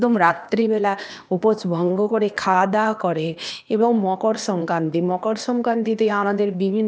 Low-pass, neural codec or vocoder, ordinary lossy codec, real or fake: none; codec, 16 kHz, 0.8 kbps, ZipCodec; none; fake